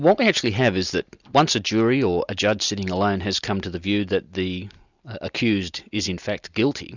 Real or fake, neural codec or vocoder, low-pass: real; none; 7.2 kHz